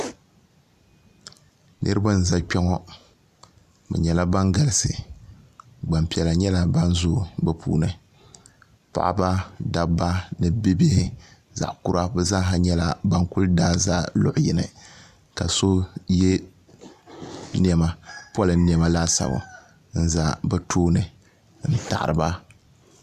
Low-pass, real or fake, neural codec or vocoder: 14.4 kHz; real; none